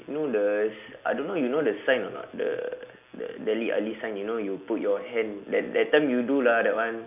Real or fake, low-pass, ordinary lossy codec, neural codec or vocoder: real; 3.6 kHz; none; none